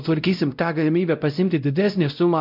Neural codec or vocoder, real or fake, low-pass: codec, 16 kHz, 0.5 kbps, X-Codec, WavLM features, trained on Multilingual LibriSpeech; fake; 5.4 kHz